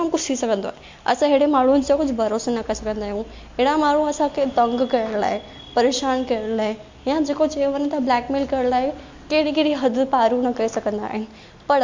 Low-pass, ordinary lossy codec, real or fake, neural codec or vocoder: 7.2 kHz; MP3, 48 kbps; real; none